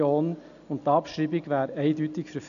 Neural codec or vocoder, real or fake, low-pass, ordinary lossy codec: none; real; 7.2 kHz; none